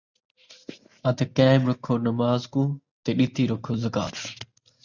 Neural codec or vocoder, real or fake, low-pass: none; real; 7.2 kHz